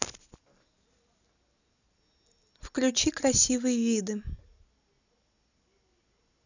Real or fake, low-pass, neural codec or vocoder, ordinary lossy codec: real; 7.2 kHz; none; none